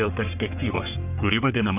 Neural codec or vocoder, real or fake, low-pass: codec, 44.1 kHz, 3.4 kbps, Pupu-Codec; fake; 3.6 kHz